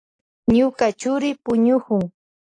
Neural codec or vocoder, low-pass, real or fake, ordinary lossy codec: none; 9.9 kHz; real; AAC, 48 kbps